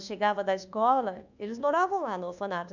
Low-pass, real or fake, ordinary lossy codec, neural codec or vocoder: 7.2 kHz; fake; none; codec, 24 kHz, 1.2 kbps, DualCodec